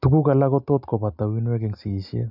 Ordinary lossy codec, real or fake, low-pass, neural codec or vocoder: none; real; 5.4 kHz; none